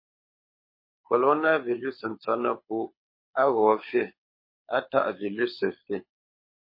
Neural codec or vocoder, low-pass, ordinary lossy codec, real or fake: codec, 24 kHz, 6 kbps, HILCodec; 5.4 kHz; MP3, 24 kbps; fake